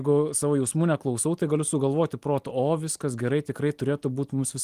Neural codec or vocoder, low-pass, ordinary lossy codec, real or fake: none; 14.4 kHz; Opus, 24 kbps; real